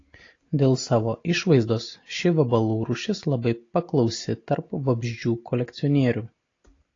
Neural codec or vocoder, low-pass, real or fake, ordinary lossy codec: none; 7.2 kHz; real; AAC, 32 kbps